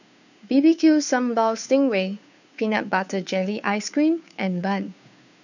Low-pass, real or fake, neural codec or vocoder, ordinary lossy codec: 7.2 kHz; fake; codec, 16 kHz, 2 kbps, FunCodec, trained on Chinese and English, 25 frames a second; none